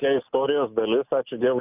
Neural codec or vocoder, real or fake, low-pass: none; real; 3.6 kHz